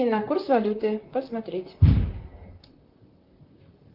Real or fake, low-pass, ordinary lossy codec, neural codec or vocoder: fake; 5.4 kHz; Opus, 24 kbps; vocoder, 44.1 kHz, 128 mel bands, Pupu-Vocoder